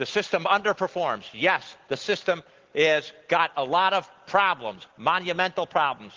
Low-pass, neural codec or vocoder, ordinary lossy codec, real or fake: 7.2 kHz; none; Opus, 16 kbps; real